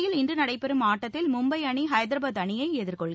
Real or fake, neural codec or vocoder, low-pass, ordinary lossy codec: real; none; none; none